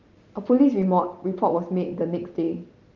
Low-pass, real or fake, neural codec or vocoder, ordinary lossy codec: 7.2 kHz; real; none; Opus, 32 kbps